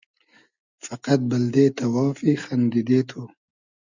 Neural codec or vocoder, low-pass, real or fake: none; 7.2 kHz; real